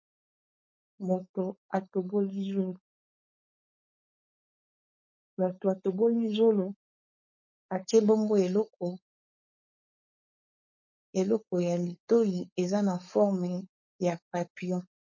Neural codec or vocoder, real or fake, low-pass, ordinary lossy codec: codec, 16 kHz, 4.8 kbps, FACodec; fake; 7.2 kHz; MP3, 32 kbps